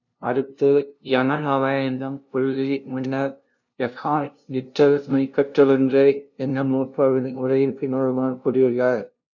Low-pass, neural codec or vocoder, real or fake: 7.2 kHz; codec, 16 kHz, 0.5 kbps, FunCodec, trained on LibriTTS, 25 frames a second; fake